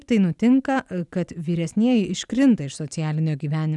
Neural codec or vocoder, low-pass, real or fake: none; 10.8 kHz; real